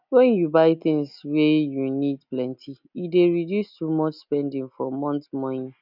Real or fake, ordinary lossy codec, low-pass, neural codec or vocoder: real; none; 5.4 kHz; none